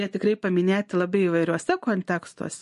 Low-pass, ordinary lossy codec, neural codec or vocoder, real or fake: 14.4 kHz; MP3, 48 kbps; none; real